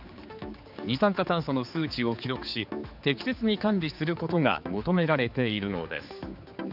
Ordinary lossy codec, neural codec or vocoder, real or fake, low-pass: none; codec, 16 kHz, 4 kbps, X-Codec, HuBERT features, trained on general audio; fake; 5.4 kHz